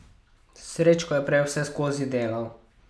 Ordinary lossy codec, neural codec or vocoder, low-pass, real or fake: none; none; none; real